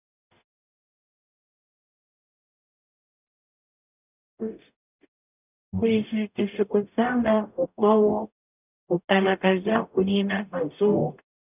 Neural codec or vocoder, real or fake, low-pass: codec, 44.1 kHz, 0.9 kbps, DAC; fake; 3.6 kHz